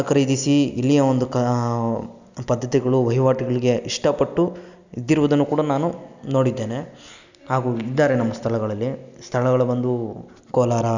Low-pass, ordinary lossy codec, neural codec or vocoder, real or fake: 7.2 kHz; none; none; real